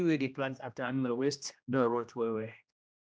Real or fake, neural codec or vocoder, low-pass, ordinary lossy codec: fake; codec, 16 kHz, 1 kbps, X-Codec, HuBERT features, trained on general audio; none; none